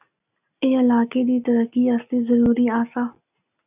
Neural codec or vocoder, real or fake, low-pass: none; real; 3.6 kHz